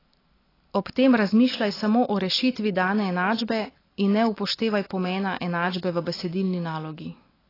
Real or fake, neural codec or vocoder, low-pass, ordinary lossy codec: real; none; 5.4 kHz; AAC, 24 kbps